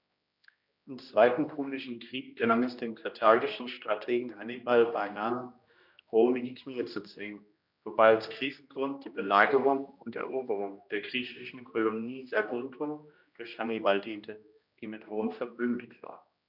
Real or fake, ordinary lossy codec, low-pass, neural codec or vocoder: fake; none; 5.4 kHz; codec, 16 kHz, 1 kbps, X-Codec, HuBERT features, trained on general audio